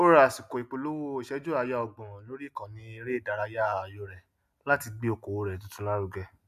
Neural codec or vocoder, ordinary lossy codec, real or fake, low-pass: none; none; real; 14.4 kHz